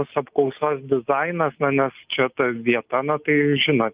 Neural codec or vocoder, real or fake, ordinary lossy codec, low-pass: none; real; Opus, 32 kbps; 3.6 kHz